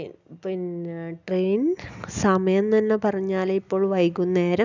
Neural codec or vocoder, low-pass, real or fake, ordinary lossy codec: none; 7.2 kHz; real; none